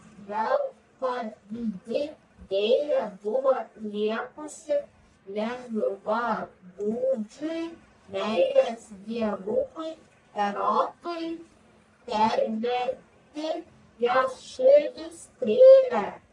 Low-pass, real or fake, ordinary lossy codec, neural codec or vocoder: 10.8 kHz; fake; MP3, 48 kbps; codec, 44.1 kHz, 1.7 kbps, Pupu-Codec